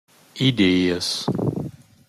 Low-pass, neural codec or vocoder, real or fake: 14.4 kHz; none; real